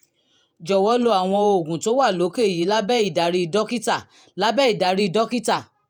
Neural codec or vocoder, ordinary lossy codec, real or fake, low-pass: vocoder, 48 kHz, 128 mel bands, Vocos; none; fake; none